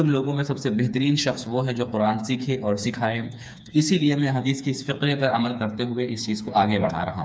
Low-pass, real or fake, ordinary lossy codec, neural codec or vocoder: none; fake; none; codec, 16 kHz, 4 kbps, FreqCodec, smaller model